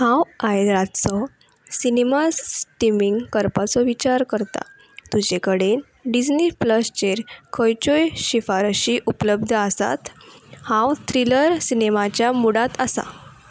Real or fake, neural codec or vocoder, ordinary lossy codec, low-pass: real; none; none; none